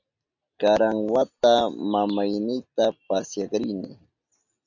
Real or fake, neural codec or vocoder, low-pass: real; none; 7.2 kHz